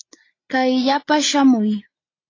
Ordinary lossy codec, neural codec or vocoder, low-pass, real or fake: AAC, 32 kbps; none; 7.2 kHz; real